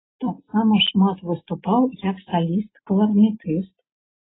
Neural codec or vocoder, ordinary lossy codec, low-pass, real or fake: none; AAC, 16 kbps; 7.2 kHz; real